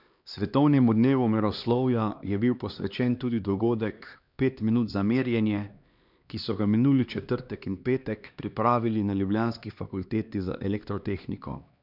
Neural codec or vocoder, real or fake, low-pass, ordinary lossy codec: codec, 16 kHz, 2 kbps, X-Codec, HuBERT features, trained on LibriSpeech; fake; 5.4 kHz; none